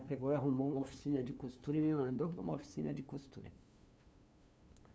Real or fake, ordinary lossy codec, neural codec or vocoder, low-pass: fake; none; codec, 16 kHz, 2 kbps, FunCodec, trained on LibriTTS, 25 frames a second; none